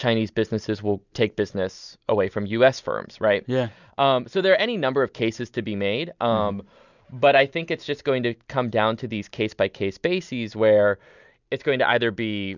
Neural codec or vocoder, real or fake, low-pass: none; real; 7.2 kHz